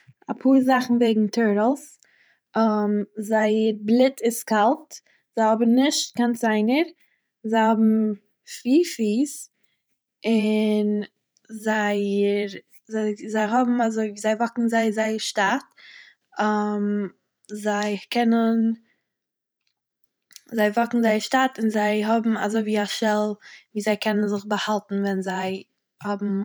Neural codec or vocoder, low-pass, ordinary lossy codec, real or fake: vocoder, 44.1 kHz, 128 mel bands every 512 samples, BigVGAN v2; none; none; fake